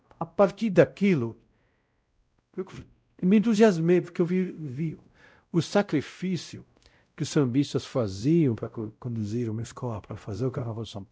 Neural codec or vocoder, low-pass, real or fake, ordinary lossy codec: codec, 16 kHz, 0.5 kbps, X-Codec, WavLM features, trained on Multilingual LibriSpeech; none; fake; none